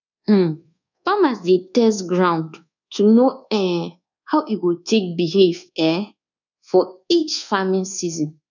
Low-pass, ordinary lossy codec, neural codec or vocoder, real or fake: 7.2 kHz; none; codec, 24 kHz, 1.2 kbps, DualCodec; fake